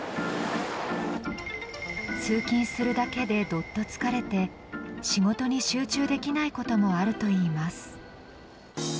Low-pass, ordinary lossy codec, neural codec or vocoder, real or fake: none; none; none; real